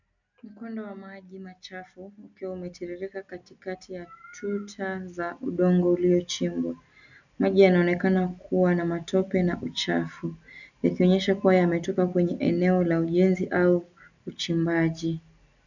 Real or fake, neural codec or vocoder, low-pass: real; none; 7.2 kHz